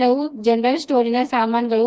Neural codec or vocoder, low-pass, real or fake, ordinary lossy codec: codec, 16 kHz, 2 kbps, FreqCodec, smaller model; none; fake; none